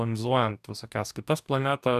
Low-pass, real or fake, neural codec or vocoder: 14.4 kHz; fake; codec, 44.1 kHz, 2.6 kbps, DAC